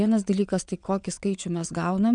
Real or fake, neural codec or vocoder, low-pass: fake; vocoder, 22.05 kHz, 80 mel bands, WaveNeXt; 9.9 kHz